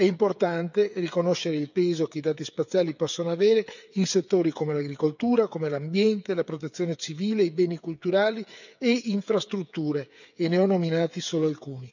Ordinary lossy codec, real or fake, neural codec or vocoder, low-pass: none; fake; codec, 16 kHz, 8 kbps, FreqCodec, smaller model; 7.2 kHz